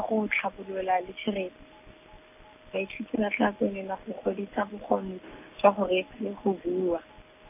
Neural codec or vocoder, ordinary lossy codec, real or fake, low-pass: none; none; real; 3.6 kHz